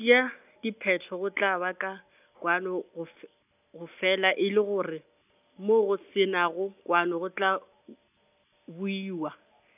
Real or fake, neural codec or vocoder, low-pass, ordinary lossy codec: real; none; 3.6 kHz; none